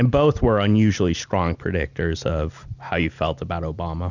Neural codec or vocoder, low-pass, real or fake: none; 7.2 kHz; real